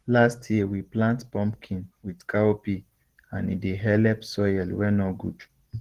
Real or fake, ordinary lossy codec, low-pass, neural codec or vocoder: real; Opus, 16 kbps; 14.4 kHz; none